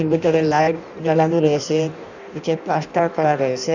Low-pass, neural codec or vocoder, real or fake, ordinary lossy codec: 7.2 kHz; codec, 16 kHz in and 24 kHz out, 0.6 kbps, FireRedTTS-2 codec; fake; none